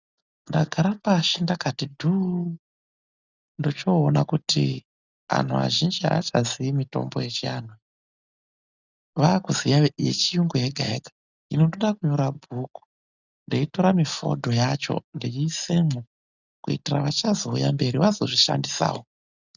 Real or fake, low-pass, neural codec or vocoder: real; 7.2 kHz; none